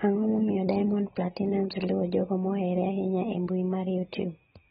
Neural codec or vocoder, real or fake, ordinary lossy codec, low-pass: none; real; AAC, 16 kbps; 19.8 kHz